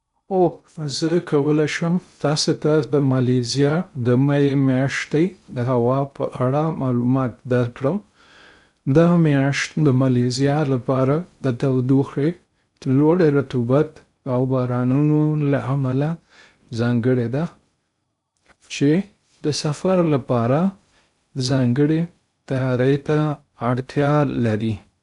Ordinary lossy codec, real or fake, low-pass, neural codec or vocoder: none; fake; 10.8 kHz; codec, 16 kHz in and 24 kHz out, 0.6 kbps, FocalCodec, streaming, 2048 codes